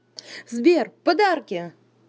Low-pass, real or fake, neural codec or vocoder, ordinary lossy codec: none; real; none; none